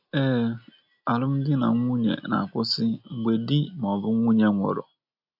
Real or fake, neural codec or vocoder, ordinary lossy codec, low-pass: real; none; none; 5.4 kHz